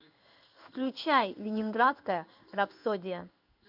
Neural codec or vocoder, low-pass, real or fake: codec, 16 kHz in and 24 kHz out, 1 kbps, XY-Tokenizer; 5.4 kHz; fake